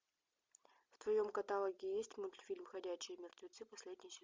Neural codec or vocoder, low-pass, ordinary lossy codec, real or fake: none; 7.2 kHz; MP3, 64 kbps; real